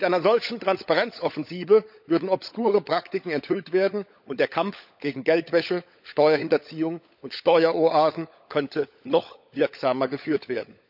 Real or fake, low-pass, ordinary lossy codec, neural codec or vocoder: fake; 5.4 kHz; none; codec, 16 kHz, 16 kbps, FunCodec, trained on LibriTTS, 50 frames a second